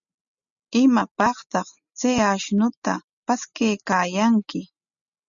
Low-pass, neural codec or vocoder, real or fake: 7.2 kHz; none; real